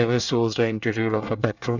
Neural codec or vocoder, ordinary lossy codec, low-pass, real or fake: codec, 24 kHz, 1 kbps, SNAC; none; 7.2 kHz; fake